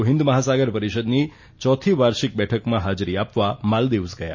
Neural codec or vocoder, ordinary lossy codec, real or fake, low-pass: none; MP3, 32 kbps; real; 7.2 kHz